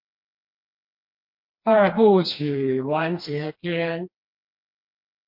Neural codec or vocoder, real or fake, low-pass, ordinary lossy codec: codec, 16 kHz, 2 kbps, FreqCodec, smaller model; fake; 5.4 kHz; MP3, 32 kbps